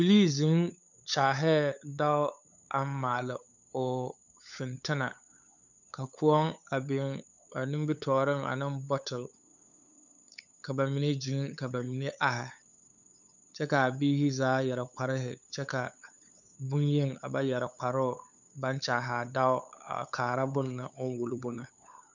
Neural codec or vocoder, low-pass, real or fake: codec, 16 kHz, 8 kbps, FunCodec, trained on LibriTTS, 25 frames a second; 7.2 kHz; fake